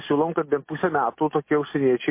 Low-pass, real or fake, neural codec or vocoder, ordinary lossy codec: 3.6 kHz; real; none; MP3, 24 kbps